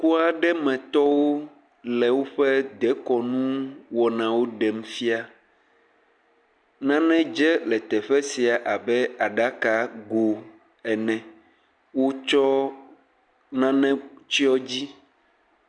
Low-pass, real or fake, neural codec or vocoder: 9.9 kHz; real; none